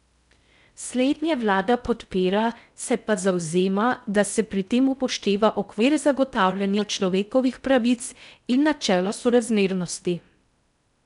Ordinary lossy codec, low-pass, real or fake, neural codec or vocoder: none; 10.8 kHz; fake; codec, 16 kHz in and 24 kHz out, 0.6 kbps, FocalCodec, streaming, 4096 codes